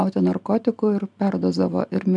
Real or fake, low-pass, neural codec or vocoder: real; 10.8 kHz; none